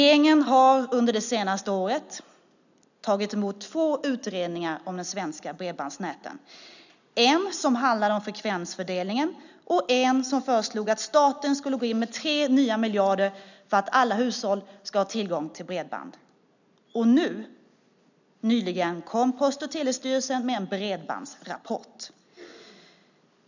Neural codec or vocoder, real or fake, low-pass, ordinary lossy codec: none; real; 7.2 kHz; none